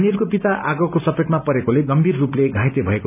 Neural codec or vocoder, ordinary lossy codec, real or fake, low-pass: none; none; real; 3.6 kHz